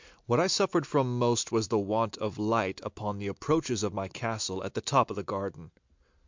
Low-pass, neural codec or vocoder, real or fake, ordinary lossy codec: 7.2 kHz; none; real; MP3, 64 kbps